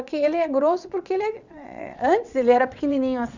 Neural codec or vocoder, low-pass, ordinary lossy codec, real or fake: vocoder, 22.05 kHz, 80 mel bands, WaveNeXt; 7.2 kHz; none; fake